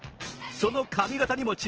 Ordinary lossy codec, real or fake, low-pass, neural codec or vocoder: Opus, 16 kbps; fake; 7.2 kHz; vocoder, 22.05 kHz, 80 mel bands, Vocos